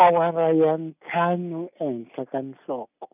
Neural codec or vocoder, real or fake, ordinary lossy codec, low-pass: none; real; none; 3.6 kHz